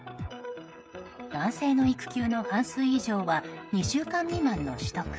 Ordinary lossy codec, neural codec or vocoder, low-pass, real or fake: none; codec, 16 kHz, 16 kbps, FreqCodec, smaller model; none; fake